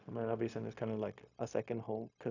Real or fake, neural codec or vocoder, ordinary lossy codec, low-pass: fake; codec, 16 kHz, 0.4 kbps, LongCat-Audio-Codec; none; 7.2 kHz